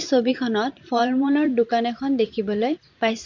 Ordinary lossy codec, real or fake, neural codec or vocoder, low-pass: AAC, 48 kbps; fake; vocoder, 44.1 kHz, 128 mel bands every 512 samples, BigVGAN v2; 7.2 kHz